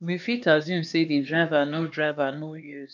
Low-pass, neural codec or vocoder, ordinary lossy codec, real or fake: 7.2 kHz; codec, 16 kHz, 2 kbps, X-Codec, HuBERT features, trained on LibriSpeech; none; fake